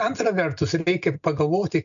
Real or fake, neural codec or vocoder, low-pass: real; none; 7.2 kHz